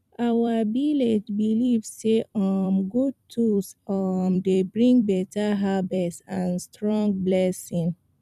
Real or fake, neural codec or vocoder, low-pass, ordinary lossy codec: fake; vocoder, 44.1 kHz, 128 mel bands every 256 samples, BigVGAN v2; 14.4 kHz; none